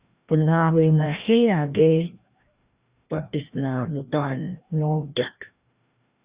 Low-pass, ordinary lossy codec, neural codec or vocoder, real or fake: 3.6 kHz; Opus, 64 kbps; codec, 16 kHz, 1 kbps, FreqCodec, larger model; fake